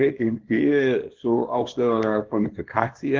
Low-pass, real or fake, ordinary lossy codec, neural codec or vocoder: 7.2 kHz; fake; Opus, 16 kbps; codec, 24 kHz, 0.9 kbps, WavTokenizer, small release